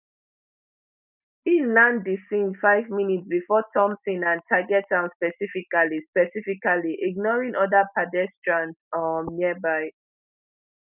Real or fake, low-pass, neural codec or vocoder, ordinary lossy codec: real; 3.6 kHz; none; none